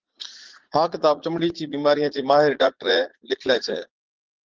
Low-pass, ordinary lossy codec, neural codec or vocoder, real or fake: 7.2 kHz; Opus, 16 kbps; vocoder, 44.1 kHz, 80 mel bands, Vocos; fake